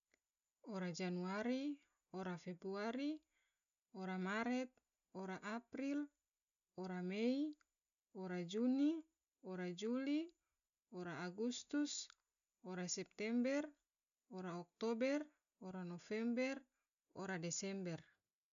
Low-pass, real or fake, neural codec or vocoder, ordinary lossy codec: 7.2 kHz; real; none; none